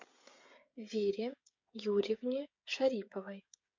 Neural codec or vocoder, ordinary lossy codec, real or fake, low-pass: codec, 16 kHz, 16 kbps, FreqCodec, smaller model; MP3, 48 kbps; fake; 7.2 kHz